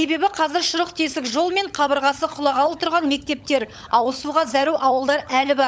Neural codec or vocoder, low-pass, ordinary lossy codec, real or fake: codec, 16 kHz, 16 kbps, FunCodec, trained on LibriTTS, 50 frames a second; none; none; fake